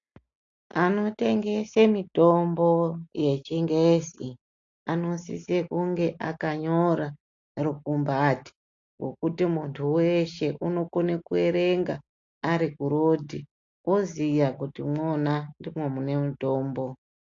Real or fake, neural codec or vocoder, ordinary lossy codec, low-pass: real; none; AAC, 48 kbps; 7.2 kHz